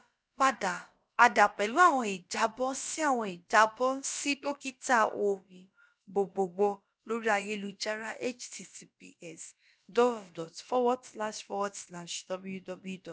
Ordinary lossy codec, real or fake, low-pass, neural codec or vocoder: none; fake; none; codec, 16 kHz, about 1 kbps, DyCAST, with the encoder's durations